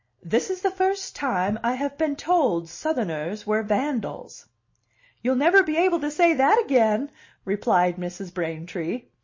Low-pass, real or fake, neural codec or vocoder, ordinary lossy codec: 7.2 kHz; real; none; MP3, 32 kbps